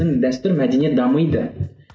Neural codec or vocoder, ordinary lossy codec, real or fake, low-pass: none; none; real; none